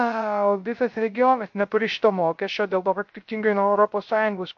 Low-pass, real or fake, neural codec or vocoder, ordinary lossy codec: 7.2 kHz; fake; codec, 16 kHz, 0.3 kbps, FocalCodec; MP3, 48 kbps